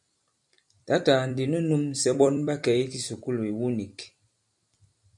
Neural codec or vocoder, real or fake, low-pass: vocoder, 44.1 kHz, 128 mel bands every 256 samples, BigVGAN v2; fake; 10.8 kHz